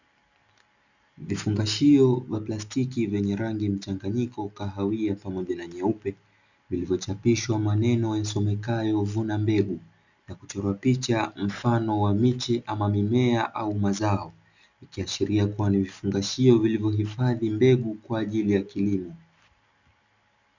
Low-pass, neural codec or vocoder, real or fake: 7.2 kHz; none; real